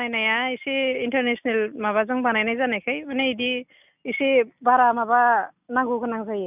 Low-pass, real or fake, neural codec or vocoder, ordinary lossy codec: 3.6 kHz; real; none; none